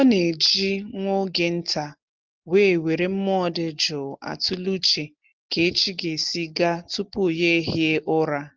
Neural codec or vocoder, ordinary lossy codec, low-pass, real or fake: none; Opus, 24 kbps; 7.2 kHz; real